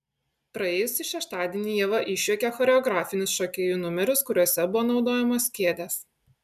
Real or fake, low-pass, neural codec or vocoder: real; 14.4 kHz; none